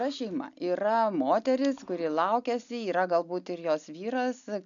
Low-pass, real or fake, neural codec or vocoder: 7.2 kHz; real; none